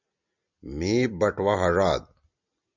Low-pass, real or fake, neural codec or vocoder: 7.2 kHz; real; none